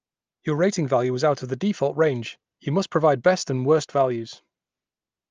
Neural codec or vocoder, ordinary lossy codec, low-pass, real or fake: none; Opus, 24 kbps; 7.2 kHz; real